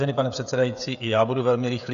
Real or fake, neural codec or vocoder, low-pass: fake; codec, 16 kHz, 16 kbps, FreqCodec, smaller model; 7.2 kHz